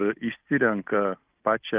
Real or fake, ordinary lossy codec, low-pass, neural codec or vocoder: real; Opus, 24 kbps; 3.6 kHz; none